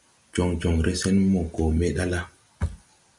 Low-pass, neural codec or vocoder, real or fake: 10.8 kHz; none; real